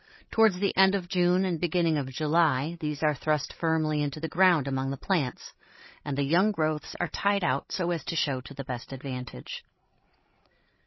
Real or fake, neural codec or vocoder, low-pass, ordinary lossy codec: fake; codec, 16 kHz, 16 kbps, FreqCodec, larger model; 7.2 kHz; MP3, 24 kbps